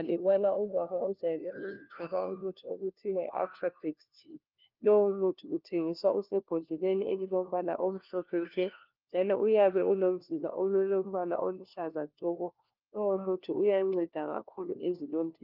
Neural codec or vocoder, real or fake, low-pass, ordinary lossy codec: codec, 16 kHz, 1 kbps, FunCodec, trained on LibriTTS, 50 frames a second; fake; 5.4 kHz; Opus, 24 kbps